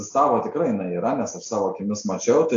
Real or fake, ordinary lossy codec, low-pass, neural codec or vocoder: real; AAC, 48 kbps; 7.2 kHz; none